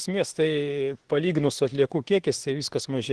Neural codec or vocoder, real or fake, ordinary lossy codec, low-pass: autoencoder, 48 kHz, 32 numbers a frame, DAC-VAE, trained on Japanese speech; fake; Opus, 16 kbps; 10.8 kHz